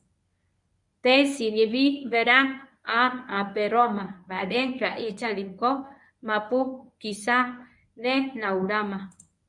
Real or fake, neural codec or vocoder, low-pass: fake; codec, 24 kHz, 0.9 kbps, WavTokenizer, medium speech release version 1; 10.8 kHz